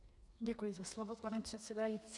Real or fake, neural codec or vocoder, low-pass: fake; codec, 32 kHz, 1.9 kbps, SNAC; 10.8 kHz